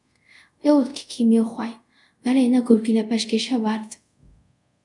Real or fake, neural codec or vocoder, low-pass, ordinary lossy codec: fake; codec, 24 kHz, 0.5 kbps, DualCodec; 10.8 kHz; MP3, 96 kbps